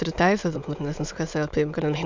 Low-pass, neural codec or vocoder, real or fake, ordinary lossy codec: 7.2 kHz; autoencoder, 22.05 kHz, a latent of 192 numbers a frame, VITS, trained on many speakers; fake; MP3, 64 kbps